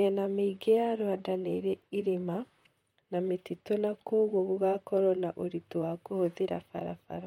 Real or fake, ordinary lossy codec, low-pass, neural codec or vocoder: fake; MP3, 64 kbps; 19.8 kHz; vocoder, 44.1 kHz, 128 mel bands, Pupu-Vocoder